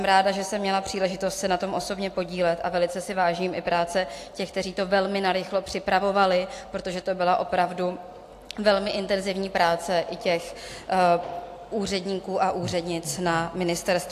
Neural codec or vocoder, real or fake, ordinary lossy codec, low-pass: none; real; AAC, 64 kbps; 14.4 kHz